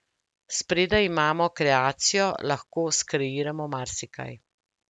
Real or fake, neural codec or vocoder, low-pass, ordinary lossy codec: real; none; none; none